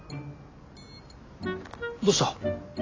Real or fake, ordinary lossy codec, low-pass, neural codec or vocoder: real; AAC, 32 kbps; 7.2 kHz; none